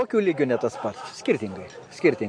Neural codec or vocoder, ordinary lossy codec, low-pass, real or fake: none; MP3, 48 kbps; 9.9 kHz; real